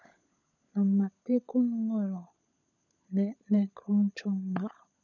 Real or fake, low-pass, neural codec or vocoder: fake; 7.2 kHz; codec, 16 kHz, 16 kbps, FunCodec, trained on LibriTTS, 50 frames a second